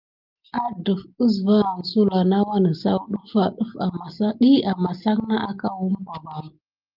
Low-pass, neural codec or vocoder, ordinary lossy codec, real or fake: 5.4 kHz; none; Opus, 24 kbps; real